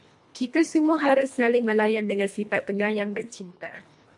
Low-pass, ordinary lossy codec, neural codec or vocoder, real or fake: 10.8 kHz; MP3, 64 kbps; codec, 24 kHz, 1.5 kbps, HILCodec; fake